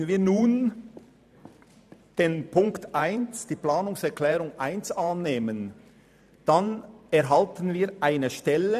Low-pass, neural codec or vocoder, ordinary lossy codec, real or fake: 14.4 kHz; vocoder, 44.1 kHz, 128 mel bands every 512 samples, BigVGAN v2; none; fake